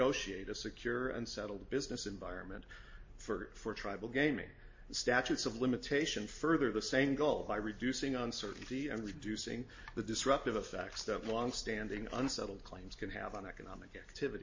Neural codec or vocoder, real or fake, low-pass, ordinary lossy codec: none; real; 7.2 kHz; MP3, 32 kbps